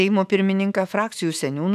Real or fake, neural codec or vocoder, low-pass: fake; autoencoder, 48 kHz, 128 numbers a frame, DAC-VAE, trained on Japanese speech; 14.4 kHz